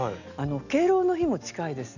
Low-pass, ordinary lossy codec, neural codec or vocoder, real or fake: 7.2 kHz; none; none; real